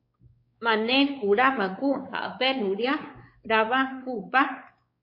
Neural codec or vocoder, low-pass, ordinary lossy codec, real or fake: codec, 16 kHz, 4 kbps, X-Codec, WavLM features, trained on Multilingual LibriSpeech; 5.4 kHz; MP3, 32 kbps; fake